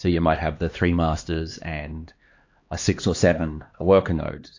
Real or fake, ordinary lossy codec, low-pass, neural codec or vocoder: fake; AAC, 48 kbps; 7.2 kHz; codec, 16 kHz, 2 kbps, X-Codec, HuBERT features, trained on LibriSpeech